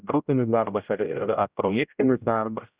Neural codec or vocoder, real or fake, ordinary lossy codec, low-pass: codec, 16 kHz, 0.5 kbps, X-Codec, HuBERT features, trained on general audio; fake; Opus, 64 kbps; 3.6 kHz